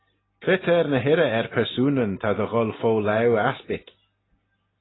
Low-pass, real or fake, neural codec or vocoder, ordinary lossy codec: 7.2 kHz; real; none; AAC, 16 kbps